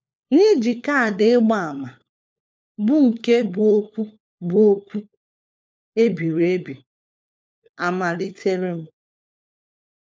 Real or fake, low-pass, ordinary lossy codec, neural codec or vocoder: fake; none; none; codec, 16 kHz, 4 kbps, FunCodec, trained on LibriTTS, 50 frames a second